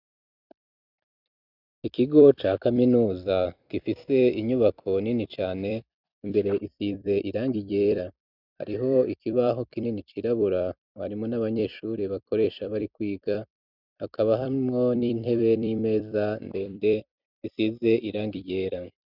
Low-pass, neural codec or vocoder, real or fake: 5.4 kHz; vocoder, 44.1 kHz, 128 mel bands every 256 samples, BigVGAN v2; fake